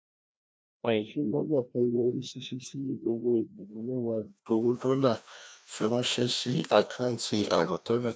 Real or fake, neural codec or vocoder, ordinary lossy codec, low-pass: fake; codec, 16 kHz, 1 kbps, FreqCodec, larger model; none; none